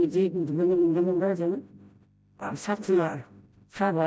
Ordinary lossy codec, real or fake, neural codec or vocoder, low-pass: none; fake; codec, 16 kHz, 0.5 kbps, FreqCodec, smaller model; none